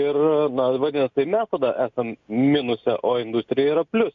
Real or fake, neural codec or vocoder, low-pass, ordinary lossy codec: real; none; 7.2 kHz; MP3, 64 kbps